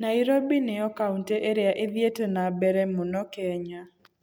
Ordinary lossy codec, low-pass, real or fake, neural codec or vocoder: none; none; real; none